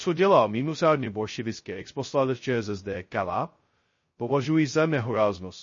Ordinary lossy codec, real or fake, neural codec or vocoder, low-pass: MP3, 32 kbps; fake; codec, 16 kHz, 0.2 kbps, FocalCodec; 7.2 kHz